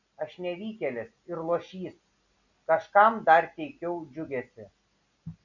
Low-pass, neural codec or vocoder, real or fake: 7.2 kHz; none; real